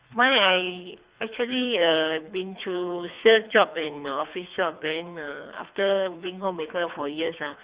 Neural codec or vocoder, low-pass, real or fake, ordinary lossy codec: codec, 24 kHz, 3 kbps, HILCodec; 3.6 kHz; fake; Opus, 64 kbps